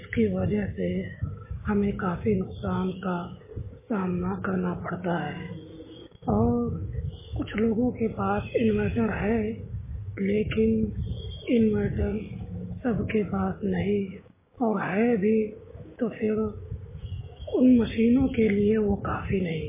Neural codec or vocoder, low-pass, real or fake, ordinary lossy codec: codec, 16 kHz, 6 kbps, DAC; 3.6 kHz; fake; MP3, 16 kbps